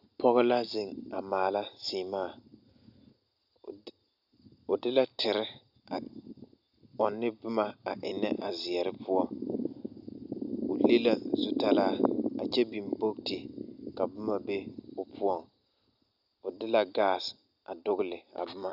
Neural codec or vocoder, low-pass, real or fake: none; 5.4 kHz; real